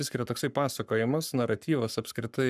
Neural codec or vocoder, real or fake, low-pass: codec, 44.1 kHz, 7.8 kbps, Pupu-Codec; fake; 14.4 kHz